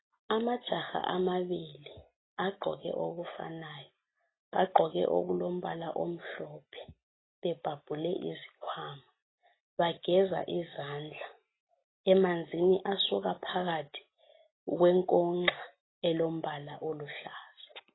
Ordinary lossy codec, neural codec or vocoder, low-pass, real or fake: AAC, 16 kbps; none; 7.2 kHz; real